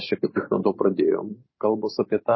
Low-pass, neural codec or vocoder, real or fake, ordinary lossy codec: 7.2 kHz; vocoder, 44.1 kHz, 128 mel bands every 512 samples, BigVGAN v2; fake; MP3, 24 kbps